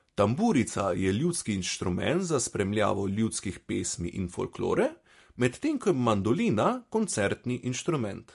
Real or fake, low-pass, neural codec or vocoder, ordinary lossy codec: real; 14.4 kHz; none; MP3, 48 kbps